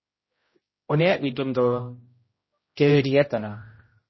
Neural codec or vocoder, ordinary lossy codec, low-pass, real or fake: codec, 16 kHz, 0.5 kbps, X-Codec, HuBERT features, trained on general audio; MP3, 24 kbps; 7.2 kHz; fake